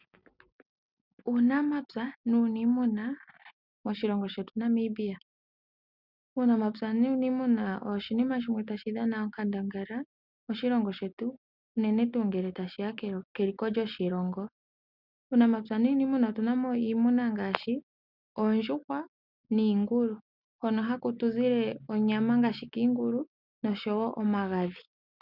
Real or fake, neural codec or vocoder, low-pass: real; none; 5.4 kHz